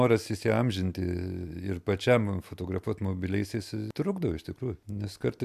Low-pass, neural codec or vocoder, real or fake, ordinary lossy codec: 14.4 kHz; none; real; AAC, 96 kbps